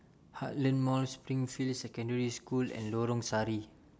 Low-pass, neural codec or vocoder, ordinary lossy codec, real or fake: none; none; none; real